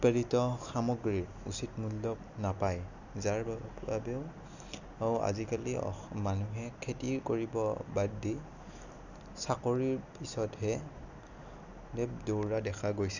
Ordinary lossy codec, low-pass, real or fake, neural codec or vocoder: none; 7.2 kHz; real; none